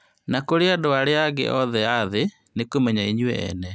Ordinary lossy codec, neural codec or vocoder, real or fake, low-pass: none; none; real; none